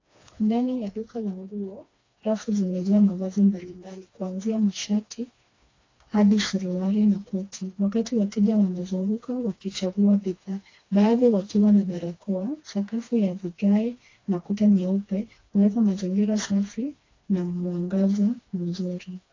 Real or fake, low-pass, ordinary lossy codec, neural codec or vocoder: fake; 7.2 kHz; AAC, 32 kbps; codec, 16 kHz, 2 kbps, FreqCodec, smaller model